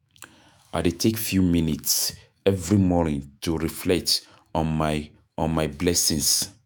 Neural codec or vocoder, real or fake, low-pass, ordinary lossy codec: autoencoder, 48 kHz, 128 numbers a frame, DAC-VAE, trained on Japanese speech; fake; none; none